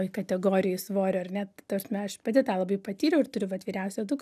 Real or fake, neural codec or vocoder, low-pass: real; none; 14.4 kHz